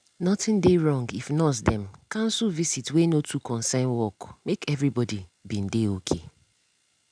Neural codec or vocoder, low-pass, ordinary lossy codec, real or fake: none; 9.9 kHz; none; real